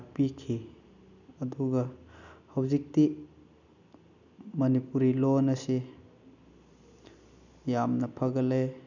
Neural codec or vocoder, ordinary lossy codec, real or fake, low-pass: none; none; real; 7.2 kHz